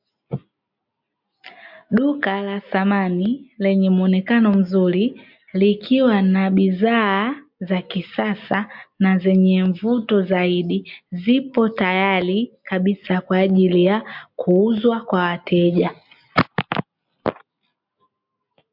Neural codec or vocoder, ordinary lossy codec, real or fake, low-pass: none; MP3, 48 kbps; real; 5.4 kHz